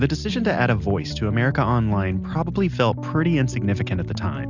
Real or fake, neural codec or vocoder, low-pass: real; none; 7.2 kHz